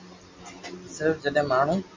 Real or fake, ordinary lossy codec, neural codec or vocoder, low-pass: real; MP3, 64 kbps; none; 7.2 kHz